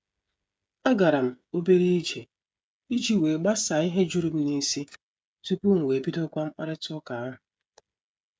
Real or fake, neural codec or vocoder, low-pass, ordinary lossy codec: fake; codec, 16 kHz, 16 kbps, FreqCodec, smaller model; none; none